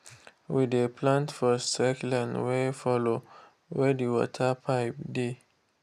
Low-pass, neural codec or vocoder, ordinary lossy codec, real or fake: 14.4 kHz; none; none; real